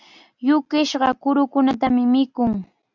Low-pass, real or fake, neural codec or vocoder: 7.2 kHz; real; none